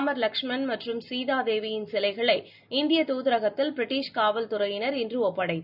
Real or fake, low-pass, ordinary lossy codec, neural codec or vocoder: real; 5.4 kHz; none; none